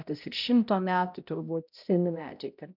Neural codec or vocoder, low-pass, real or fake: codec, 16 kHz, 0.5 kbps, X-Codec, HuBERT features, trained on balanced general audio; 5.4 kHz; fake